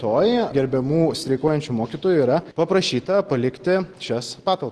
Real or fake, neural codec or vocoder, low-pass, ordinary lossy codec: real; none; 10.8 kHz; Opus, 16 kbps